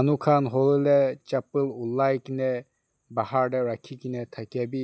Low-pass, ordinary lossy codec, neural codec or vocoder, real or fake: none; none; none; real